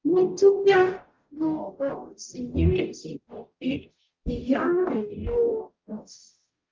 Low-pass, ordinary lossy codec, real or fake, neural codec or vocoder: 7.2 kHz; Opus, 24 kbps; fake; codec, 44.1 kHz, 0.9 kbps, DAC